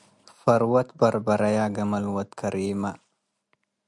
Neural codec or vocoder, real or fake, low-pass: none; real; 10.8 kHz